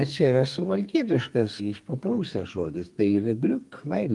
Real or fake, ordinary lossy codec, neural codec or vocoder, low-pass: fake; Opus, 32 kbps; codec, 32 kHz, 1.9 kbps, SNAC; 10.8 kHz